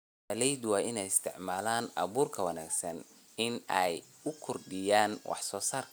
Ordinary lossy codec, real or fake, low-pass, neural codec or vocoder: none; real; none; none